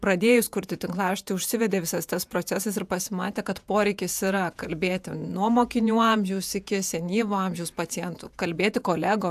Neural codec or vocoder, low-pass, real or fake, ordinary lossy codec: vocoder, 48 kHz, 128 mel bands, Vocos; 14.4 kHz; fake; AAC, 96 kbps